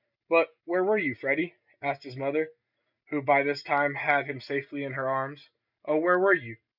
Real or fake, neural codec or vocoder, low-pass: real; none; 5.4 kHz